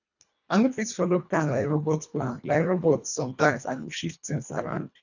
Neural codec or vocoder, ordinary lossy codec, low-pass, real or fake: codec, 24 kHz, 1.5 kbps, HILCodec; none; 7.2 kHz; fake